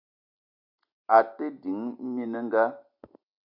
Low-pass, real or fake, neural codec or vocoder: 5.4 kHz; real; none